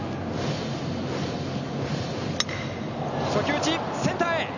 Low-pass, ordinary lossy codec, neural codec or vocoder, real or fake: 7.2 kHz; none; none; real